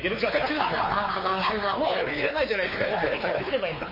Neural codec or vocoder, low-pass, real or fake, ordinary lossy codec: codec, 16 kHz, 4 kbps, X-Codec, WavLM features, trained on Multilingual LibriSpeech; 5.4 kHz; fake; MP3, 24 kbps